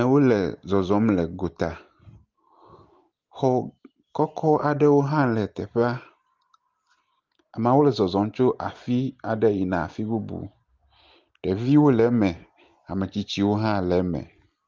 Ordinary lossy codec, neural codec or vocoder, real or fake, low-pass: Opus, 32 kbps; none; real; 7.2 kHz